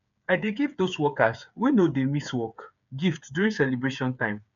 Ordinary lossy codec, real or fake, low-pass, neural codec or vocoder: none; fake; 7.2 kHz; codec, 16 kHz, 8 kbps, FreqCodec, smaller model